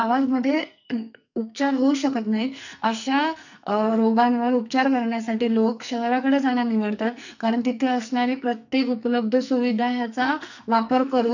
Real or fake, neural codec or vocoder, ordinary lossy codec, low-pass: fake; codec, 44.1 kHz, 2.6 kbps, SNAC; none; 7.2 kHz